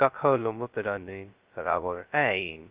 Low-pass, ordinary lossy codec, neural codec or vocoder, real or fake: 3.6 kHz; Opus, 32 kbps; codec, 16 kHz, 0.2 kbps, FocalCodec; fake